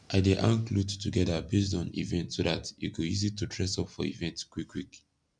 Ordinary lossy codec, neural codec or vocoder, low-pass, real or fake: none; none; 9.9 kHz; real